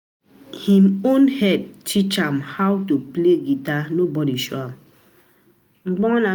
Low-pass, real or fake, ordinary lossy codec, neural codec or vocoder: none; real; none; none